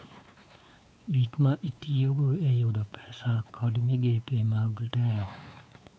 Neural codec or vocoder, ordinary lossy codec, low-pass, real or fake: codec, 16 kHz, 4 kbps, X-Codec, WavLM features, trained on Multilingual LibriSpeech; none; none; fake